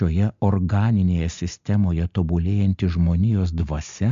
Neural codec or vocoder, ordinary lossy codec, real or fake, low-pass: none; AAC, 64 kbps; real; 7.2 kHz